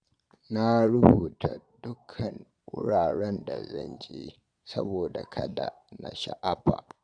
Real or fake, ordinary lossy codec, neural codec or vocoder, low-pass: fake; none; vocoder, 22.05 kHz, 80 mel bands, Vocos; 9.9 kHz